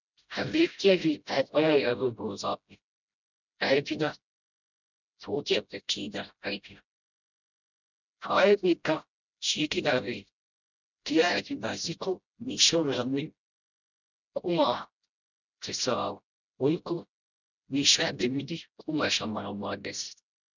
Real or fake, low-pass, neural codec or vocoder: fake; 7.2 kHz; codec, 16 kHz, 0.5 kbps, FreqCodec, smaller model